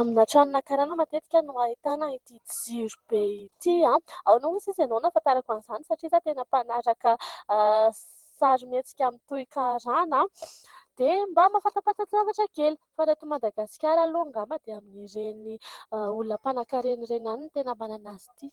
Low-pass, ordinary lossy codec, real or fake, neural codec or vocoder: 19.8 kHz; Opus, 16 kbps; fake; vocoder, 44.1 kHz, 128 mel bands every 512 samples, BigVGAN v2